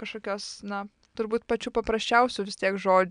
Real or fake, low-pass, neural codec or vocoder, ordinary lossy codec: real; 9.9 kHz; none; MP3, 96 kbps